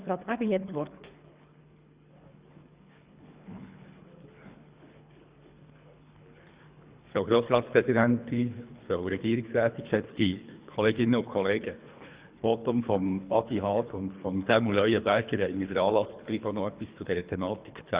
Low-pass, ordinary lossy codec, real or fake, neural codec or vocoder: 3.6 kHz; Opus, 64 kbps; fake; codec, 24 kHz, 3 kbps, HILCodec